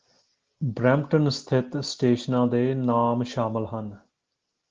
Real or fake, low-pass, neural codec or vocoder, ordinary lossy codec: real; 7.2 kHz; none; Opus, 16 kbps